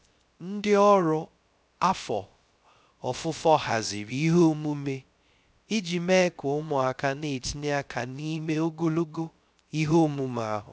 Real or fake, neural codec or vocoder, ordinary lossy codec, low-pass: fake; codec, 16 kHz, 0.3 kbps, FocalCodec; none; none